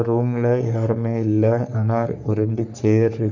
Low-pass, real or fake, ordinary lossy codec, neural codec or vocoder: 7.2 kHz; fake; none; codec, 44.1 kHz, 3.4 kbps, Pupu-Codec